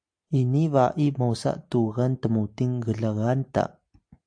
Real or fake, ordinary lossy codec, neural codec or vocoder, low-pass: real; AAC, 64 kbps; none; 9.9 kHz